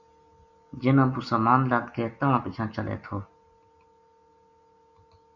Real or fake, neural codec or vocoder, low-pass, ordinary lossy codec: real; none; 7.2 kHz; Opus, 64 kbps